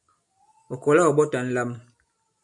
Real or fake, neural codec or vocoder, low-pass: real; none; 10.8 kHz